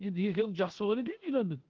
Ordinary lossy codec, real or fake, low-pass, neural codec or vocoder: Opus, 16 kbps; fake; 7.2 kHz; codec, 16 kHz, 0.7 kbps, FocalCodec